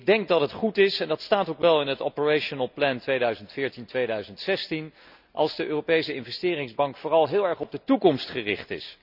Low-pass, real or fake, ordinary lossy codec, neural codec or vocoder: 5.4 kHz; real; none; none